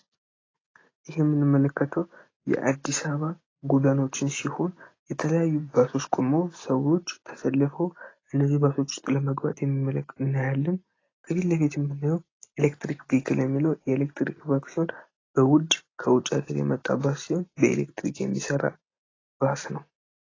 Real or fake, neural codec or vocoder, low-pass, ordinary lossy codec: real; none; 7.2 kHz; AAC, 32 kbps